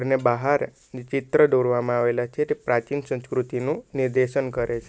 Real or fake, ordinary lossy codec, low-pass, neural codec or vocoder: real; none; none; none